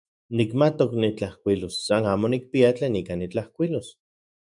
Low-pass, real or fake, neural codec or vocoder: 10.8 kHz; fake; autoencoder, 48 kHz, 128 numbers a frame, DAC-VAE, trained on Japanese speech